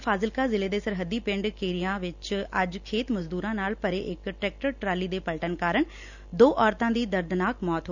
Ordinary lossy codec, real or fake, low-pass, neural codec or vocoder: none; real; 7.2 kHz; none